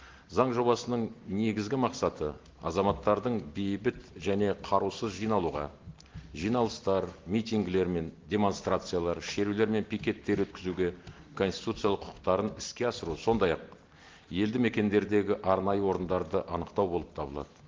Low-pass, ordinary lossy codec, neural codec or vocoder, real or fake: 7.2 kHz; Opus, 16 kbps; none; real